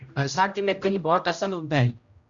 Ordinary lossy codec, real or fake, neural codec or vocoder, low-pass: Opus, 64 kbps; fake; codec, 16 kHz, 0.5 kbps, X-Codec, HuBERT features, trained on general audio; 7.2 kHz